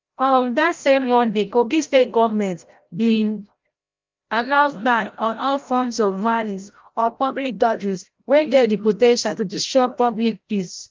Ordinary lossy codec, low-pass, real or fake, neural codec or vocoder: Opus, 32 kbps; 7.2 kHz; fake; codec, 16 kHz, 0.5 kbps, FreqCodec, larger model